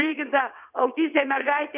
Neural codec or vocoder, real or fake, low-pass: vocoder, 22.05 kHz, 80 mel bands, WaveNeXt; fake; 3.6 kHz